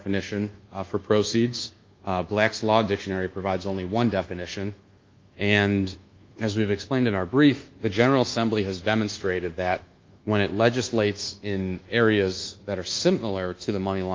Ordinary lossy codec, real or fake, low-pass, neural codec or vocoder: Opus, 32 kbps; fake; 7.2 kHz; codec, 24 kHz, 1.2 kbps, DualCodec